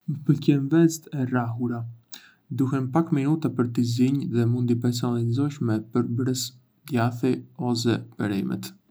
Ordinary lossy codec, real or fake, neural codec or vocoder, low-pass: none; real; none; none